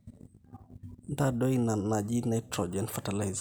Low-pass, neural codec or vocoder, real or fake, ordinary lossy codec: none; none; real; none